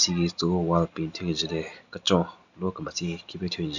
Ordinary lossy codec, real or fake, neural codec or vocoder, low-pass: none; real; none; 7.2 kHz